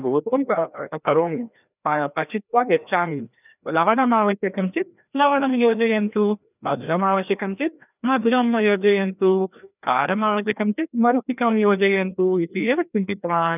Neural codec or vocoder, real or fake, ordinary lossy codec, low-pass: codec, 16 kHz, 1 kbps, FreqCodec, larger model; fake; none; 3.6 kHz